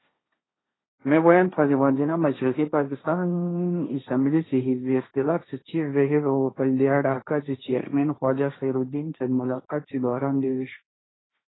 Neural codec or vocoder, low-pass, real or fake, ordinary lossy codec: codec, 16 kHz, 1.1 kbps, Voila-Tokenizer; 7.2 kHz; fake; AAC, 16 kbps